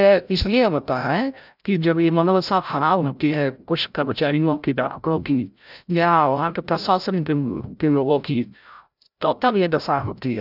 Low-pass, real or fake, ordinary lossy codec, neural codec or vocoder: 5.4 kHz; fake; none; codec, 16 kHz, 0.5 kbps, FreqCodec, larger model